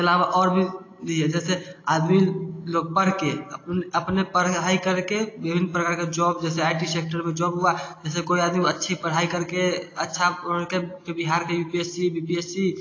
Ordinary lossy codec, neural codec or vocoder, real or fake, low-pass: AAC, 32 kbps; none; real; 7.2 kHz